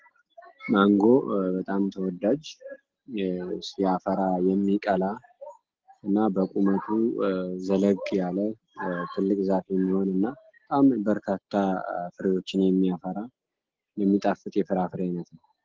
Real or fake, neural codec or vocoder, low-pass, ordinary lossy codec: real; none; 7.2 kHz; Opus, 16 kbps